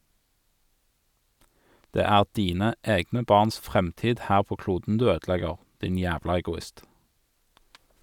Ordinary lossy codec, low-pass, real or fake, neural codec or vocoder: none; 19.8 kHz; real; none